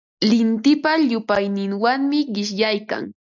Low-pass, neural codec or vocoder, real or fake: 7.2 kHz; none; real